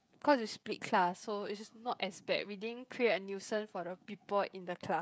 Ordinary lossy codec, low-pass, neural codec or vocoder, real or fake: none; none; codec, 16 kHz, 16 kbps, FreqCodec, smaller model; fake